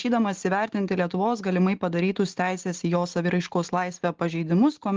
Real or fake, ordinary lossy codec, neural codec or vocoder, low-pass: real; Opus, 16 kbps; none; 7.2 kHz